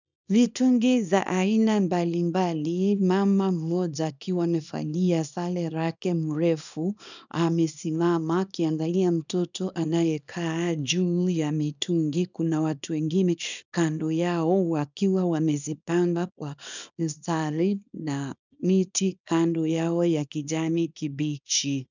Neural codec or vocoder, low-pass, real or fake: codec, 24 kHz, 0.9 kbps, WavTokenizer, small release; 7.2 kHz; fake